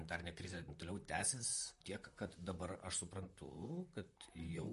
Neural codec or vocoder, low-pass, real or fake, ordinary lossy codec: vocoder, 44.1 kHz, 128 mel bands, Pupu-Vocoder; 14.4 kHz; fake; MP3, 48 kbps